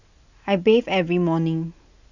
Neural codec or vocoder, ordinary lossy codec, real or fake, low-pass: none; none; real; 7.2 kHz